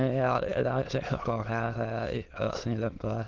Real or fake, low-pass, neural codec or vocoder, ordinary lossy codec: fake; 7.2 kHz; autoencoder, 22.05 kHz, a latent of 192 numbers a frame, VITS, trained on many speakers; Opus, 16 kbps